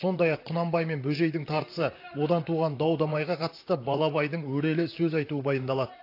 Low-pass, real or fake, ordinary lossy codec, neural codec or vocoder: 5.4 kHz; fake; AAC, 32 kbps; vocoder, 44.1 kHz, 128 mel bands, Pupu-Vocoder